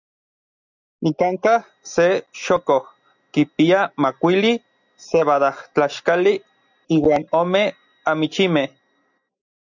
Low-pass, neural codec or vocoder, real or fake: 7.2 kHz; none; real